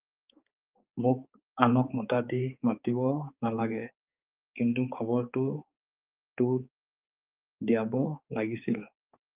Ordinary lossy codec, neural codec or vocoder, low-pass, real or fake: Opus, 24 kbps; codec, 16 kHz, 4 kbps, X-Codec, HuBERT features, trained on balanced general audio; 3.6 kHz; fake